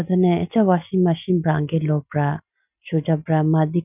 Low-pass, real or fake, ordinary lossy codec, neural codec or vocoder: 3.6 kHz; real; none; none